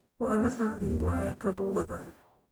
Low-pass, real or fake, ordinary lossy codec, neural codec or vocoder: none; fake; none; codec, 44.1 kHz, 0.9 kbps, DAC